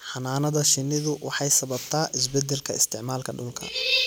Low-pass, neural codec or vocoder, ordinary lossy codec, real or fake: none; none; none; real